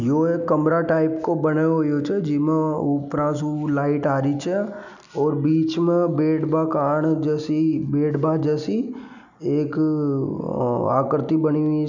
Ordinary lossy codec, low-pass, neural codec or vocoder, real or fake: none; 7.2 kHz; none; real